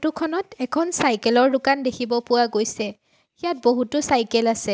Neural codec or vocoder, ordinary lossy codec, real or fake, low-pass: none; none; real; none